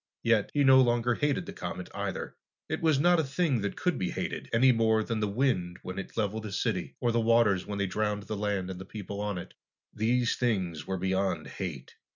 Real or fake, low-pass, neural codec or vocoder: real; 7.2 kHz; none